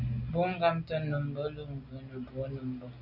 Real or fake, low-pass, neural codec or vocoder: real; 5.4 kHz; none